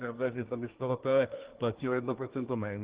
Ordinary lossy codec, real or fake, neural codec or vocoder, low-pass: Opus, 16 kbps; fake; codec, 24 kHz, 1 kbps, SNAC; 3.6 kHz